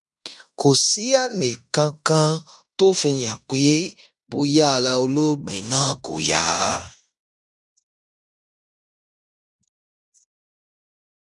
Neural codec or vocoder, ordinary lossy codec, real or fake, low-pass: codec, 16 kHz in and 24 kHz out, 0.9 kbps, LongCat-Audio-Codec, fine tuned four codebook decoder; none; fake; 10.8 kHz